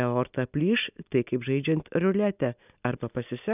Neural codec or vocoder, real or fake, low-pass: none; real; 3.6 kHz